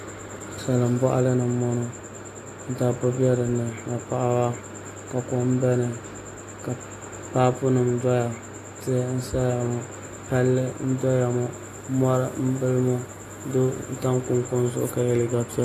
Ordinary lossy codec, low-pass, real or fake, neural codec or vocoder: AAC, 48 kbps; 14.4 kHz; real; none